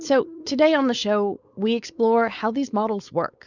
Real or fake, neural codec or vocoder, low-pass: fake; codec, 16 kHz, 4.8 kbps, FACodec; 7.2 kHz